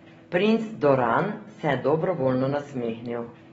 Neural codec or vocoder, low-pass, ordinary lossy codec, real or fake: none; 9.9 kHz; AAC, 24 kbps; real